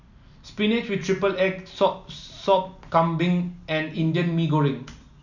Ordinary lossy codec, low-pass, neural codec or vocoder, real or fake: none; 7.2 kHz; none; real